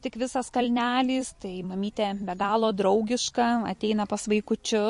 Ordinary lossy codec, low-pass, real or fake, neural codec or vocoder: MP3, 48 kbps; 14.4 kHz; fake; vocoder, 44.1 kHz, 128 mel bands every 256 samples, BigVGAN v2